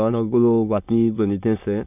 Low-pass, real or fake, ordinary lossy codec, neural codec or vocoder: 3.6 kHz; fake; none; autoencoder, 22.05 kHz, a latent of 192 numbers a frame, VITS, trained on many speakers